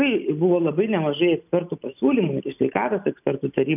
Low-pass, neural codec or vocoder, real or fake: 3.6 kHz; none; real